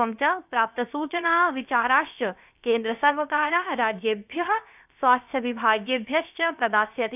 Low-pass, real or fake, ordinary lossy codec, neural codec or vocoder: 3.6 kHz; fake; none; codec, 16 kHz, about 1 kbps, DyCAST, with the encoder's durations